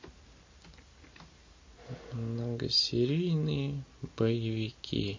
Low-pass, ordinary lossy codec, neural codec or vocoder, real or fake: 7.2 kHz; MP3, 32 kbps; none; real